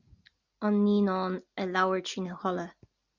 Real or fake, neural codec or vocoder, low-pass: real; none; 7.2 kHz